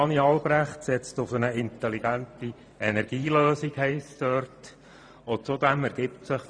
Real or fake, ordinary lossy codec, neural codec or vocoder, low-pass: fake; none; vocoder, 44.1 kHz, 128 mel bands every 512 samples, BigVGAN v2; 9.9 kHz